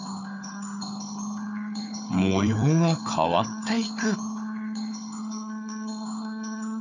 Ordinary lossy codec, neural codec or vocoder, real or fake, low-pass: none; codec, 16 kHz, 4 kbps, FunCodec, trained on Chinese and English, 50 frames a second; fake; 7.2 kHz